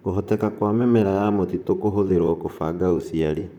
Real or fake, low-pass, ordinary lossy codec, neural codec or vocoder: fake; 19.8 kHz; none; vocoder, 44.1 kHz, 128 mel bands, Pupu-Vocoder